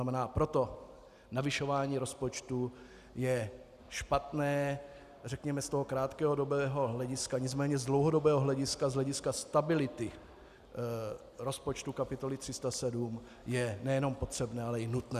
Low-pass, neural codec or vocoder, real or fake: 14.4 kHz; none; real